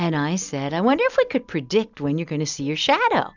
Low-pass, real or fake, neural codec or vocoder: 7.2 kHz; real; none